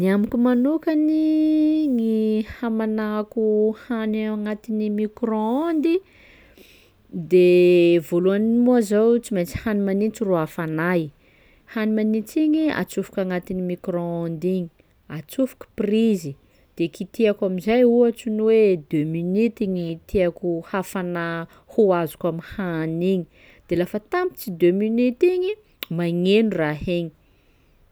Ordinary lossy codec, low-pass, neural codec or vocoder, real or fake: none; none; none; real